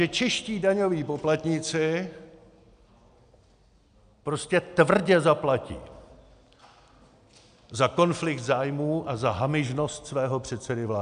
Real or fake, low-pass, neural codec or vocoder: real; 9.9 kHz; none